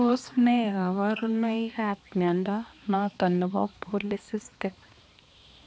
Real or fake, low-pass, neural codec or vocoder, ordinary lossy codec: fake; none; codec, 16 kHz, 2 kbps, X-Codec, HuBERT features, trained on balanced general audio; none